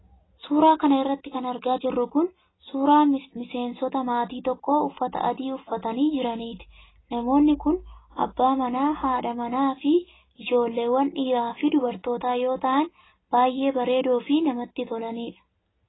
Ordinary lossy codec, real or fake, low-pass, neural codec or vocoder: AAC, 16 kbps; real; 7.2 kHz; none